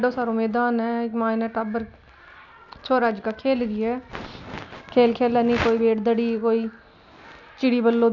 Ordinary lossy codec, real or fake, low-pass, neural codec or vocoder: none; real; 7.2 kHz; none